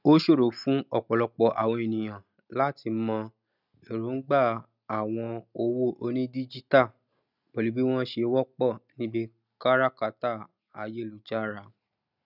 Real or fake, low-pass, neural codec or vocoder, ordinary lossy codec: real; 5.4 kHz; none; none